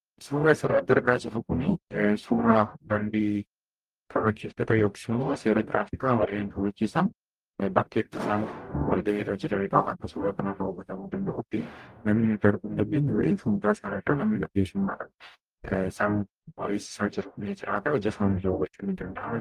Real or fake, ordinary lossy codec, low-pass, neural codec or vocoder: fake; Opus, 32 kbps; 14.4 kHz; codec, 44.1 kHz, 0.9 kbps, DAC